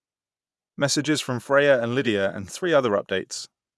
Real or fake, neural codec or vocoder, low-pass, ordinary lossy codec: fake; vocoder, 24 kHz, 100 mel bands, Vocos; none; none